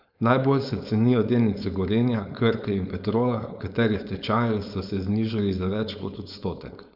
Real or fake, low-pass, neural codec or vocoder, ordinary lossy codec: fake; 5.4 kHz; codec, 16 kHz, 4.8 kbps, FACodec; none